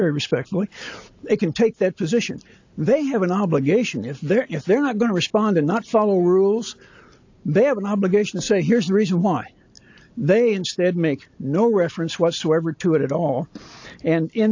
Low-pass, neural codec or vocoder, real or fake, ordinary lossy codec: 7.2 kHz; none; real; AAC, 48 kbps